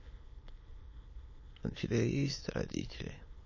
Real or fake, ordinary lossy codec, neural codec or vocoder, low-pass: fake; MP3, 32 kbps; autoencoder, 22.05 kHz, a latent of 192 numbers a frame, VITS, trained on many speakers; 7.2 kHz